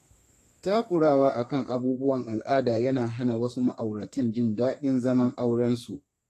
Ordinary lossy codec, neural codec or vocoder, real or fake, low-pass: AAC, 48 kbps; codec, 32 kHz, 1.9 kbps, SNAC; fake; 14.4 kHz